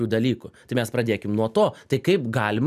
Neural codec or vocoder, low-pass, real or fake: none; 14.4 kHz; real